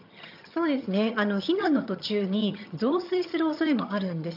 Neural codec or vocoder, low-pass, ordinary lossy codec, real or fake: vocoder, 22.05 kHz, 80 mel bands, HiFi-GAN; 5.4 kHz; none; fake